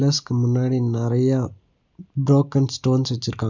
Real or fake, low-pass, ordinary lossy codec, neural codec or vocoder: real; 7.2 kHz; none; none